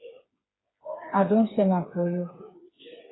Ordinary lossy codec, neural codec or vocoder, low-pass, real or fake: AAC, 16 kbps; codec, 16 kHz, 4 kbps, FreqCodec, smaller model; 7.2 kHz; fake